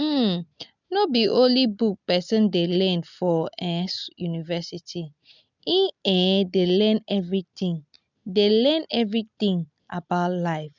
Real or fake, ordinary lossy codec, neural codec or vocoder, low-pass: real; none; none; 7.2 kHz